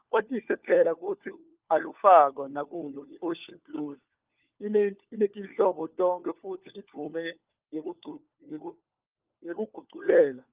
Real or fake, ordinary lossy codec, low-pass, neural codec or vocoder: fake; Opus, 32 kbps; 3.6 kHz; codec, 16 kHz, 4 kbps, FunCodec, trained on LibriTTS, 50 frames a second